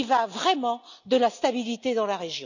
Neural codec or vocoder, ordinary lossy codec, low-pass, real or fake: none; none; 7.2 kHz; real